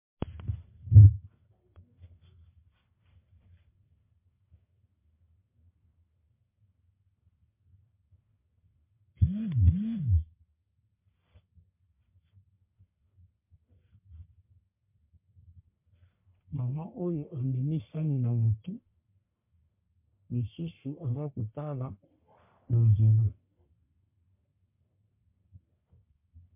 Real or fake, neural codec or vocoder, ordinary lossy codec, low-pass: fake; codec, 44.1 kHz, 1.7 kbps, Pupu-Codec; MP3, 32 kbps; 3.6 kHz